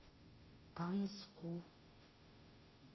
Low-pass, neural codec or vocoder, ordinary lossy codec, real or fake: 7.2 kHz; codec, 16 kHz, 0.5 kbps, FunCodec, trained on Chinese and English, 25 frames a second; MP3, 24 kbps; fake